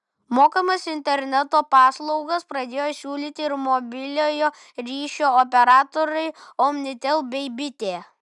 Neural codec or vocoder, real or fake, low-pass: none; real; 10.8 kHz